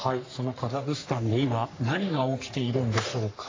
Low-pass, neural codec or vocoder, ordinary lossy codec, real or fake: 7.2 kHz; codec, 44.1 kHz, 3.4 kbps, Pupu-Codec; AAC, 32 kbps; fake